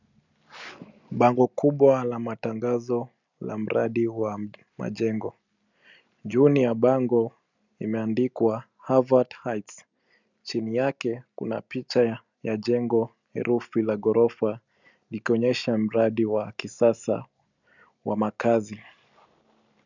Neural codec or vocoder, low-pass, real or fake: none; 7.2 kHz; real